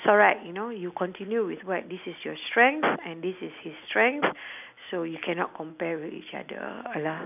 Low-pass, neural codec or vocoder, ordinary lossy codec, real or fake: 3.6 kHz; none; none; real